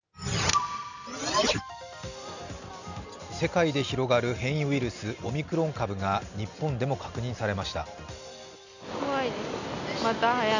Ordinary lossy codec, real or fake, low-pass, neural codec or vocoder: none; real; 7.2 kHz; none